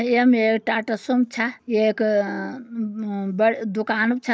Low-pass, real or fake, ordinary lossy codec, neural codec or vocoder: none; real; none; none